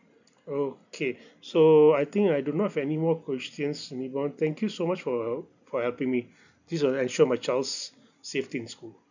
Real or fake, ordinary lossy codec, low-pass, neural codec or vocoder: real; none; 7.2 kHz; none